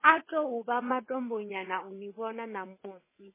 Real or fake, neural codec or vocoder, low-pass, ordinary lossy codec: fake; vocoder, 22.05 kHz, 80 mel bands, WaveNeXt; 3.6 kHz; MP3, 16 kbps